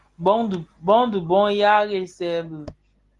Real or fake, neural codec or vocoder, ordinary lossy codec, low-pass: real; none; Opus, 24 kbps; 10.8 kHz